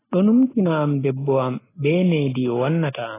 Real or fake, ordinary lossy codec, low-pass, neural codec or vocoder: real; AAC, 16 kbps; 3.6 kHz; none